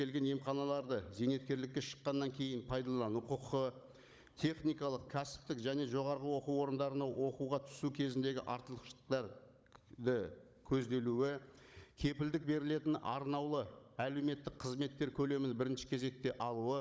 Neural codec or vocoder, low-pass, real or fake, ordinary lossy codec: codec, 16 kHz, 16 kbps, FunCodec, trained on Chinese and English, 50 frames a second; none; fake; none